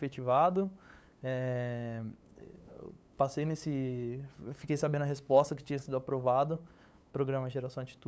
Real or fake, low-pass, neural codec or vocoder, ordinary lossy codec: fake; none; codec, 16 kHz, 8 kbps, FunCodec, trained on LibriTTS, 25 frames a second; none